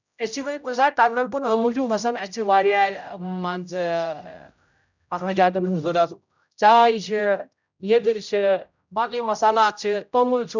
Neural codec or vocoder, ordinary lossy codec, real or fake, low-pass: codec, 16 kHz, 0.5 kbps, X-Codec, HuBERT features, trained on general audio; none; fake; 7.2 kHz